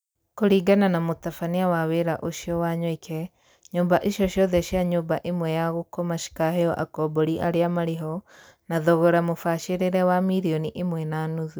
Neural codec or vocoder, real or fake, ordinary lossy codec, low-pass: none; real; none; none